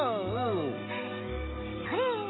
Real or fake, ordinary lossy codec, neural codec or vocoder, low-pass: real; AAC, 16 kbps; none; 7.2 kHz